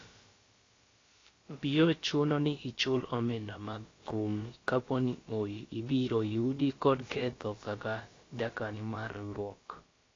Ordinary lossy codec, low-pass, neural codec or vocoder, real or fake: AAC, 32 kbps; 7.2 kHz; codec, 16 kHz, about 1 kbps, DyCAST, with the encoder's durations; fake